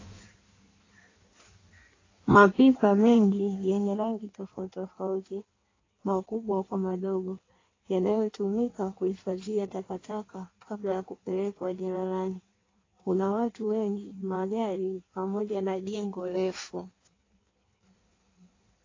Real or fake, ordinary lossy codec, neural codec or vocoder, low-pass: fake; AAC, 32 kbps; codec, 16 kHz in and 24 kHz out, 1.1 kbps, FireRedTTS-2 codec; 7.2 kHz